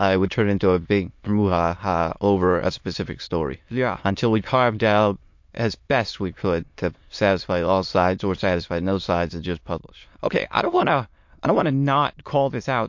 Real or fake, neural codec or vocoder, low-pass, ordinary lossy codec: fake; autoencoder, 22.05 kHz, a latent of 192 numbers a frame, VITS, trained on many speakers; 7.2 kHz; MP3, 48 kbps